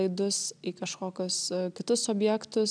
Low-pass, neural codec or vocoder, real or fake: 9.9 kHz; none; real